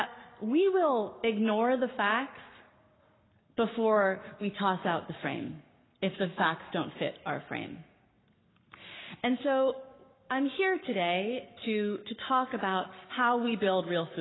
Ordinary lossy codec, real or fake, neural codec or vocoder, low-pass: AAC, 16 kbps; fake; codec, 44.1 kHz, 7.8 kbps, Pupu-Codec; 7.2 kHz